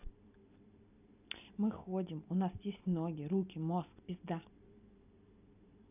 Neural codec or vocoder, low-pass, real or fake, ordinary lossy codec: none; 3.6 kHz; real; none